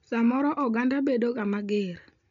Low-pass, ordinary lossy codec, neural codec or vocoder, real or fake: 7.2 kHz; none; none; real